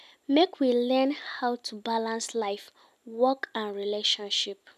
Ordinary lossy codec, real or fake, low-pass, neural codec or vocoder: none; real; 14.4 kHz; none